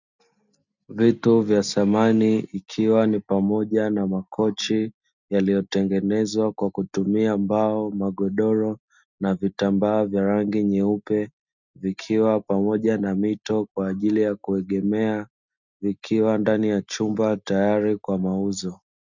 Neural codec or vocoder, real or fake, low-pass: none; real; 7.2 kHz